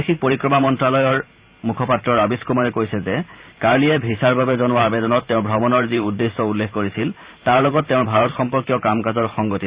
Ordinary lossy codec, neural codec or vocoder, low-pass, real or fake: Opus, 64 kbps; vocoder, 44.1 kHz, 128 mel bands every 512 samples, BigVGAN v2; 3.6 kHz; fake